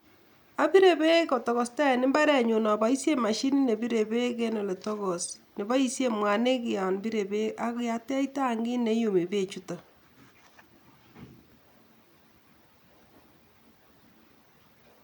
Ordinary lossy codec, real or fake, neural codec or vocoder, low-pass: none; real; none; 19.8 kHz